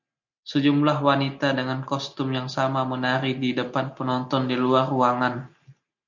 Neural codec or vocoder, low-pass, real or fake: none; 7.2 kHz; real